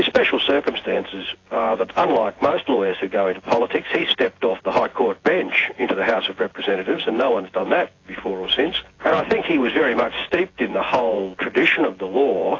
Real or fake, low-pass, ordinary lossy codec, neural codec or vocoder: fake; 7.2 kHz; AAC, 32 kbps; vocoder, 24 kHz, 100 mel bands, Vocos